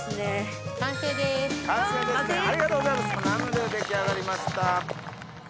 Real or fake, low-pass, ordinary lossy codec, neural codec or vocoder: real; none; none; none